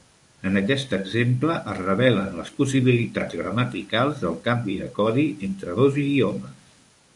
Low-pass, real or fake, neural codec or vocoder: 10.8 kHz; fake; codec, 24 kHz, 0.9 kbps, WavTokenizer, medium speech release version 1